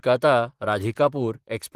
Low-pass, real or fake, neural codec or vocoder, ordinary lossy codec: 14.4 kHz; fake; vocoder, 44.1 kHz, 128 mel bands, Pupu-Vocoder; Opus, 24 kbps